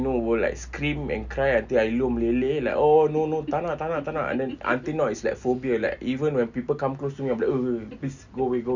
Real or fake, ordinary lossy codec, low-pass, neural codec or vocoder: real; none; 7.2 kHz; none